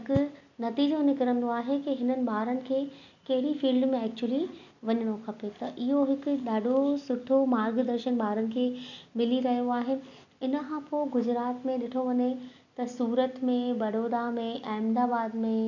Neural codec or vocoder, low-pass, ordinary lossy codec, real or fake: none; 7.2 kHz; none; real